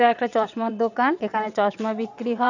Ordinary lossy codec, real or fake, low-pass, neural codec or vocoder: none; fake; 7.2 kHz; vocoder, 22.05 kHz, 80 mel bands, WaveNeXt